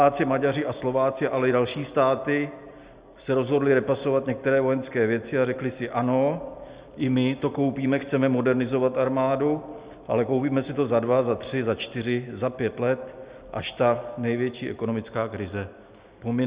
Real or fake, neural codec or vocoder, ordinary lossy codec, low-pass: real; none; Opus, 64 kbps; 3.6 kHz